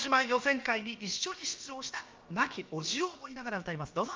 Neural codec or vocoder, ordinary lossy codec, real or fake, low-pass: codec, 16 kHz, about 1 kbps, DyCAST, with the encoder's durations; Opus, 32 kbps; fake; 7.2 kHz